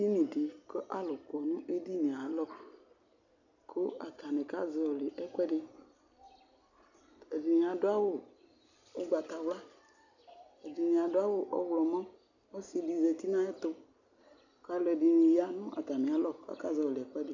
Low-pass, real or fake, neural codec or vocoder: 7.2 kHz; real; none